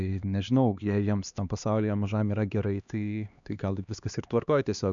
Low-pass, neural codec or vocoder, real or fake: 7.2 kHz; codec, 16 kHz, 2 kbps, X-Codec, HuBERT features, trained on LibriSpeech; fake